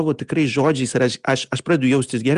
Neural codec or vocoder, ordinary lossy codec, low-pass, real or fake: none; Opus, 64 kbps; 10.8 kHz; real